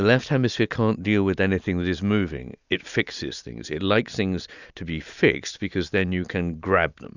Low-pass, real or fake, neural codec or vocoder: 7.2 kHz; real; none